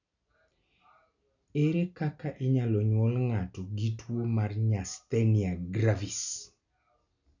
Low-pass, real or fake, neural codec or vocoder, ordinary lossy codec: 7.2 kHz; real; none; none